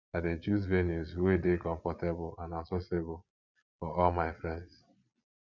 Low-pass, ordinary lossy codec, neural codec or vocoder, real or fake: 7.2 kHz; none; vocoder, 22.05 kHz, 80 mel bands, Vocos; fake